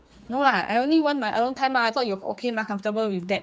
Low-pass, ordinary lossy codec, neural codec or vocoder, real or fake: none; none; codec, 16 kHz, 4 kbps, X-Codec, HuBERT features, trained on general audio; fake